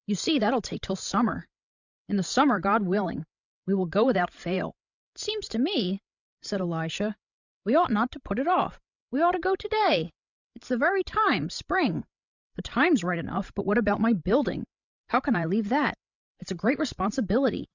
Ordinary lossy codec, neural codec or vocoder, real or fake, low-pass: Opus, 64 kbps; codec, 16 kHz, 16 kbps, FreqCodec, larger model; fake; 7.2 kHz